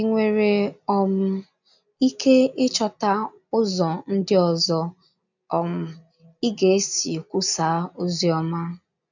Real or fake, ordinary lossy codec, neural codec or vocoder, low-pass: real; none; none; 7.2 kHz